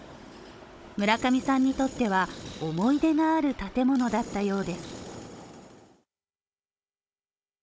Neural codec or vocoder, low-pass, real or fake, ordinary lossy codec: codec, 16 kHz, 16 kbps, FunCodec, trained on Chinese and English, 50 frames a second; none; fake; none